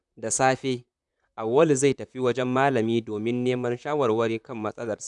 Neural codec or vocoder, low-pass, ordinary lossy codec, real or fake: vocoder, 44.1 kHz, 128 mel bands, Pupu-Vocoder; 10.8 kHz; none; fake